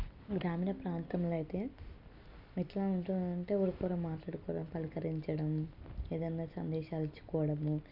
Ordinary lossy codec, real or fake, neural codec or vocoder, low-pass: none; real; none; 5.4 kHz